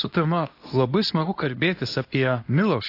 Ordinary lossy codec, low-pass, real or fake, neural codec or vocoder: AAC, 32 kbps; 5.4 kHz; fake; codec, 24 kHz, 0.9 kbps, WavTokenizer, medium speech release version 2